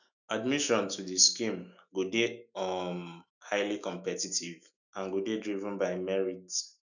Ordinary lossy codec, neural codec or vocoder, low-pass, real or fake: none; autoencoder, 48 kHz, 128 numbers a frame, DAC-VAE, trained on Japanese speech; 7.2 kHz; fake